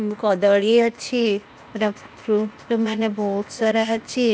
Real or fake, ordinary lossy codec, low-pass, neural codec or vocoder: fake; none; none; codec, 16 kHz, 0.8 kbps, ZipCodec